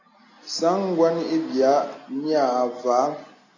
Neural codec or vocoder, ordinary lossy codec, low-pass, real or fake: none; AAC, 32 kbps; 7.2 kHz; real